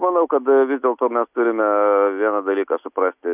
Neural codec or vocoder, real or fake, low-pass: none; real; 3.6 kHz